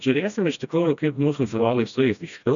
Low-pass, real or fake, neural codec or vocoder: 7.2 kHz; fake; codec, 16 kHz, 1 kbps, FreqCodec, smaller model